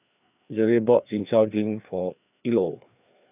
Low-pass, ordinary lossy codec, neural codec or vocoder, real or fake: 3.6 kHz; none; codec, 16 kHz, 2 kbps, FreqCodec, larger model; fake